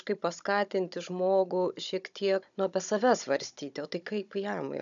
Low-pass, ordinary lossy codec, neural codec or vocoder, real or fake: 7.2 kHz; MP3, 96 kbps; codec, 16 kHz, 16 kbps, FunCodec, trained on Chinese and English, 50 frames a second; fake